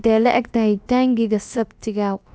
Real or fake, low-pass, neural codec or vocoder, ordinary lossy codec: fake; none; codec, 16 kHz, about 1 kbps, DyCAST, with the encoder's durations; none